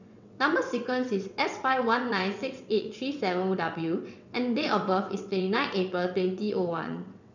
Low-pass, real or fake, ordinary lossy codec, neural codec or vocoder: 7.2 kHz; fake; none; vocoder, 22.05 kHz, 80 mel bands, WaveNeXt